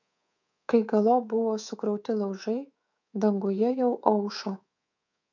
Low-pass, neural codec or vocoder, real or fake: 7.2 kHz; codec, 24 kHz, 3.1 kbps, DualCodec; fake